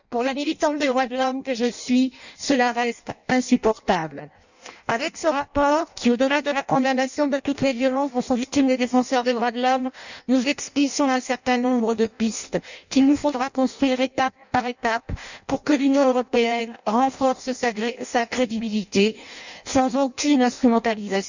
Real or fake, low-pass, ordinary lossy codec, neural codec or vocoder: fake; 7.2 kHz; none; codec, 16 kHz in and 24 kHz out, 0.6 kbps, FireRedTTS-2 codec